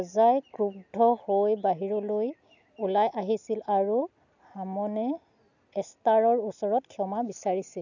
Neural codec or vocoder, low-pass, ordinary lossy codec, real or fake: none; 7.2 kHz; none; real